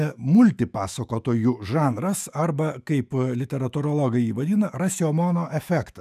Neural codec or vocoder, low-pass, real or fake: autoencoder, 48 kHz, 128 numbers a frame, DAC-VAE, trained on Japanese speech; 14.4 kHz; fake